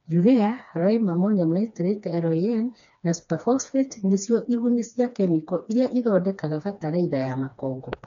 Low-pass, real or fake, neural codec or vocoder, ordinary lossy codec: 7.2 kHz; fake; codec, 16 kHz, 2 kbps, FreqCodec, smaller model; MP3, 64 kbps